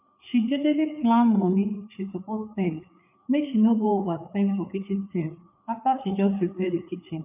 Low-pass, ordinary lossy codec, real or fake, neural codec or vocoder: 3.6 kHz; none; fake; codec, 16 kHz, 4 kbps, FreqCodec, larger model